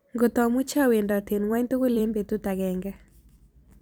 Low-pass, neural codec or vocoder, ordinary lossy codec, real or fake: none; vocoder, 44.1 kHz, 128 mel bands every 256 samples, BigVGAN v2; none; fake